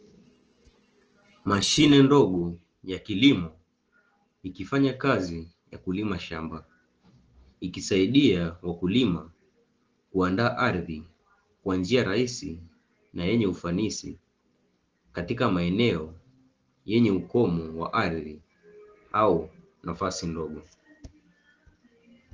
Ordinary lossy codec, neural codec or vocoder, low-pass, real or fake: Opus, 16 kbps; none; 7.2 kHz; real